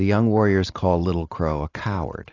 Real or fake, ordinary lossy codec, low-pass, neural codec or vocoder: fake; AAC, 32 kbps; 7.2 kHz; vocoder, 44.1 kHz, 128 mel bands every 512 samples, BigVGAN v2